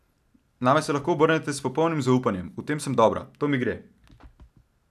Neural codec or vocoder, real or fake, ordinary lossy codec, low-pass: none; real; none; 14.4 kHz